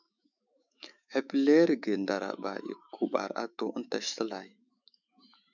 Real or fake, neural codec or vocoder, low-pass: fake; autoencoder, 48 kHz, 128 numbers a frame, DAC-VAE, trained on Japanese speech; 7.2 kHz